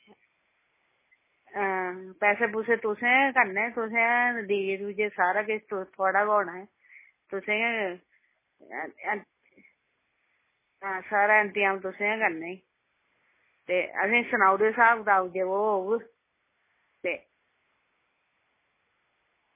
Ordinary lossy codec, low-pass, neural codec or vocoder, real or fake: MP3, 16 kbps; 3.6 kHz; none; real